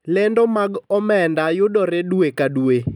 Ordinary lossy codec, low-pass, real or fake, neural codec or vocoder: none; 19.8 kHz; real; none